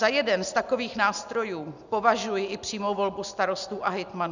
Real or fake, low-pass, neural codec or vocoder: real; 7.2 kHz; none